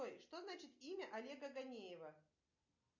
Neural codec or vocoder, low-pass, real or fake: none; 7.2 kHz; real